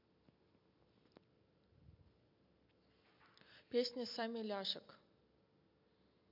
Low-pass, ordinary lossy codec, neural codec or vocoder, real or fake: 5.4 kHz; MP3, 48 kbps; none; real